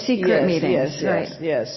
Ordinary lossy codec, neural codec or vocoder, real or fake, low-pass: MP3, 24 kbps; none; real; 7.2 kHz